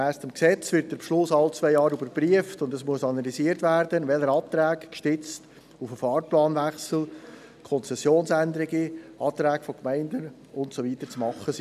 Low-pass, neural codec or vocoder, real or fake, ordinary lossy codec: 14.4 kHz; none; real; none